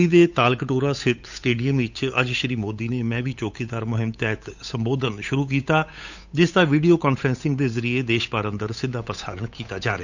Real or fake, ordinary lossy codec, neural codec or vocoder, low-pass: fake; none; codec, 16 kHz, 8 kbps, FunCodec, trained on Chinese and English, 25 frames a second; 7.2 kHz